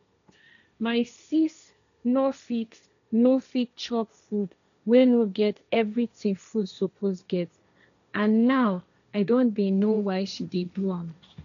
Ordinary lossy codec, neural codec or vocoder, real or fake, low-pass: none; codec, 16 kHz, 1.1 kbps, Voila-Tokenizer; fake; 7.2 kHz